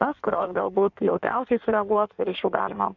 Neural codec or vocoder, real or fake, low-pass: codec, 16 kHz in and 24 kHz out, 1.1 kbps, FireRedTTS-2 codec; fake; 7.2 kHz